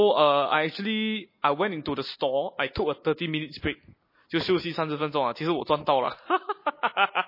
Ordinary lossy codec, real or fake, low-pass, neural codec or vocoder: MP3, 24 kbps; real; 5.4 kHz; none